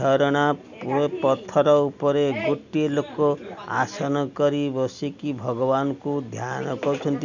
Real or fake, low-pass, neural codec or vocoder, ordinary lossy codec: real; 7.2 kHz; none; none